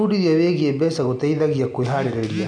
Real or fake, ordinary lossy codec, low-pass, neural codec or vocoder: real; none; 9.9 kHz; none